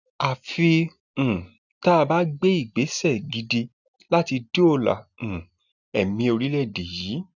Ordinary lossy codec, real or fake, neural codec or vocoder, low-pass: none; real; none; 7.2 kHz